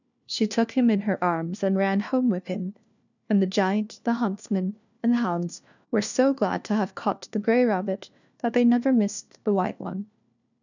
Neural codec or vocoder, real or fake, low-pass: codec, 16 kHz, 1 kbps, FunCodec, trained on LibriTTS, 50 frames a second; fake; 7.2 kHz